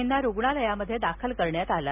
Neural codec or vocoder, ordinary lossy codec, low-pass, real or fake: none; none; 3.6 kHz; real